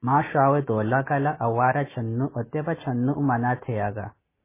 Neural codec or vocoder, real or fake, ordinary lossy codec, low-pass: none; real; MP3, 16 kbps; 3.6 kHz